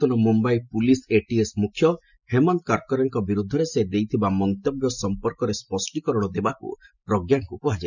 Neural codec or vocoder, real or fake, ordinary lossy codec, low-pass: none; real; none; 7.2 kHz